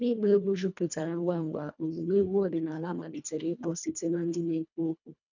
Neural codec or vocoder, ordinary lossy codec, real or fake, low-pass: codec, 24 kHz, 1.5 kbps, HILCodec; none; fake; 7.2 kHz